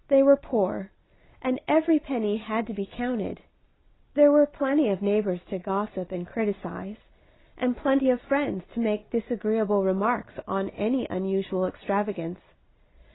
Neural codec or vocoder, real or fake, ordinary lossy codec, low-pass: none; real; AAC, 16 kbps; 7.2 kHz